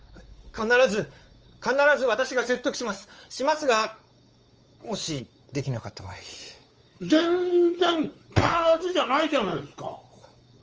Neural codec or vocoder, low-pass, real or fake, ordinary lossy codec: codec, 16 kHz, 4 kbps, X-Codec, WavLM features, trained on Multilingual LibriSpeech; 7.2 kHz; fake; Opus, 24 kbps